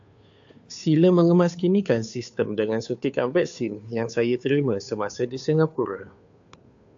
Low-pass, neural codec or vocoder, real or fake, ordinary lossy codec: 7.2 kHz; codec, 16 kHz, 2 kbps, FunCodec, trained on Chinese and English, 25 frames a second; fake; AAC, 64 kbps